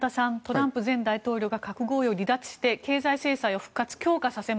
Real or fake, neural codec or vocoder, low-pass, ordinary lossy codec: real; none; none; none